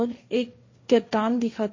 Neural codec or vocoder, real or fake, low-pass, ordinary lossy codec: codec, 16 kHz, 1.1 kbps, Voila-Tokenizer; fake; 7.2 kHz; MP3, 32 kbps